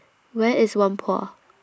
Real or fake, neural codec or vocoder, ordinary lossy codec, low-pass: real; none; none; none